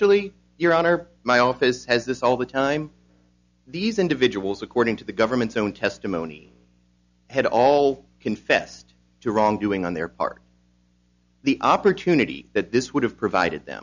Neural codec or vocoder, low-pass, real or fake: none; 7.2 kHz; real